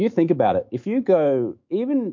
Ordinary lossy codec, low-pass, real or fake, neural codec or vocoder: MP3, 48 kbps; 7.2 kHz; fake; autoencoder, 48 kHz, 128 numbers a frame, DAC-VAE, trained on Japanese speech